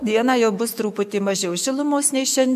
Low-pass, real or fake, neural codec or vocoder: 14.4 kHz; fake; autoencoder, 48 kHz, 128 numbers a frame, DAC-VAE, trained on Japanese speech